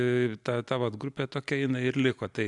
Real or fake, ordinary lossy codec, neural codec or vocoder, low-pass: real; AAC, 64 kbps; none; 10.8 kHz